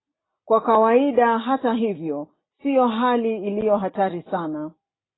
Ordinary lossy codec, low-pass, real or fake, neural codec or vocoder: AAC, 16 kbps; 7.2 kHz; real; none